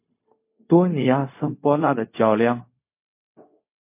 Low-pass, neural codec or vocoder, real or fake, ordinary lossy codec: 3.6 kHz; codec, 16 kHz, 0.4 kbps, LongCat-Audio-Codec; fake; MP3, 24 kbps